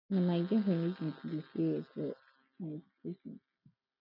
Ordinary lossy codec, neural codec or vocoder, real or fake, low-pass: none; none; real; 5.4 kHz